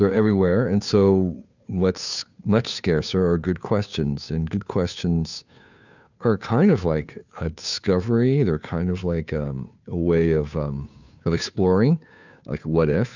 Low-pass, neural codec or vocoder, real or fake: 7.2 kHz; codec, 16 kHz, 2 kbps, FunCodec, trained on Chinese and English, 25 frames a second; fake